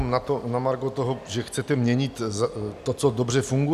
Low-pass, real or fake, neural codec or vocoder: 14.4 kHz; real; none